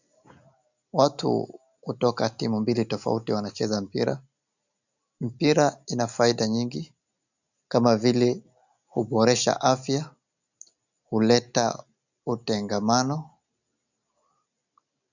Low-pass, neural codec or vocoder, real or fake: 7.2 kHz; none; real